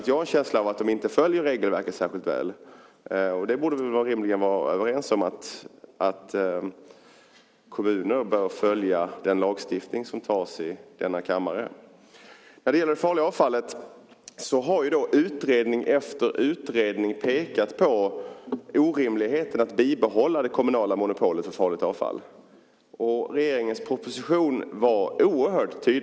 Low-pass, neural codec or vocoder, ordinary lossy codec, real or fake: none; none; none; real